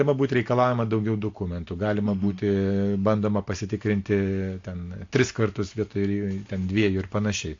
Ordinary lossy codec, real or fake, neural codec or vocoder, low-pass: AAC, 48 kbps; real; none; 7.2 kHz